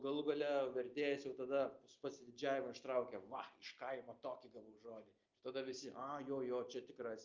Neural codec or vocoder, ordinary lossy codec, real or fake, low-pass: none; Opus, 24 kbps; real; 7.2 kHz